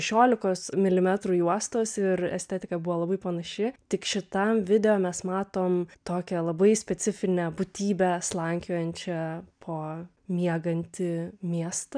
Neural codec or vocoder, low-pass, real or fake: none; 9.9 kHz; real